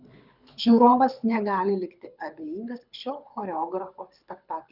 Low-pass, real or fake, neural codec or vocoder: 5.4 kHz; fake; codec, 24 kHz, 6 kbps, HILCodec